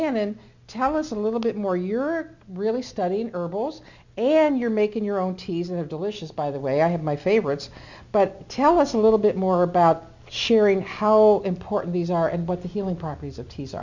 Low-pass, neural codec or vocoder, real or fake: 7.2 kHz; none; real